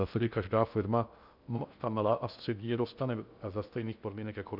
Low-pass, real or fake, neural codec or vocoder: 5.4 kHz; fake; codec, 16 kHz in and 24 kHz out, 0.6 kbps, FocalCodec, streaming, 2048 codes